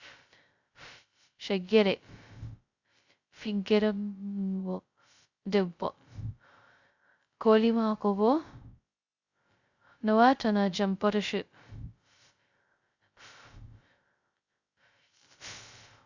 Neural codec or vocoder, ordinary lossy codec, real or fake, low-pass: codec, 16 kHz, 0.2 kbps, FocalCodec; Opus, 64 kbps; fake; 7.2 kHz